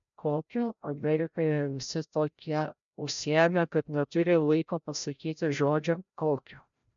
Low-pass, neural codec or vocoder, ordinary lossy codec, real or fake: 7.2 kHz; codec, 16 kHz, 0.5 kbps, FreqCodec, larger model; MP3, 64 kbps; fake